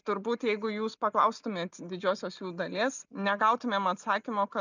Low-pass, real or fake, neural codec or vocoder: 7.2 kHz; real; none